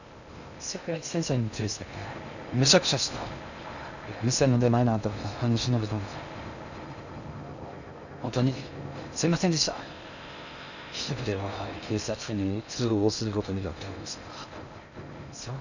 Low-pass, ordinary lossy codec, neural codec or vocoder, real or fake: 7.2 kHz; none; codec, 16 kHz in and 24 kHz out, 0.6 kbps, FocalCodec, streaming, 2048 codes; fake